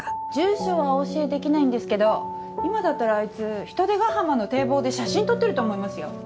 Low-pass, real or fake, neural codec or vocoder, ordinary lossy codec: none; real; none; none